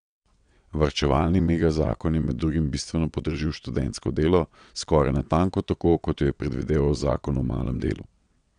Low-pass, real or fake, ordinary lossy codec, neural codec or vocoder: 9.9 kHz; fake; none; vocoder, 22.05 kHz, 80 mel bands, WaveNeXt